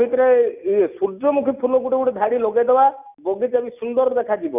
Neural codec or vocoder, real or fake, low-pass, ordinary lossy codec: none; real; 3.6 kHz; none